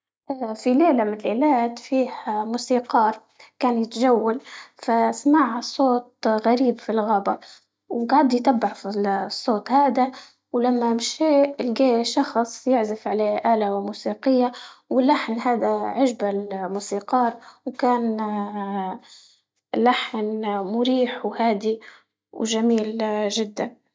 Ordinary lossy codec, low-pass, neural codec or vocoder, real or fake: none; none; none; real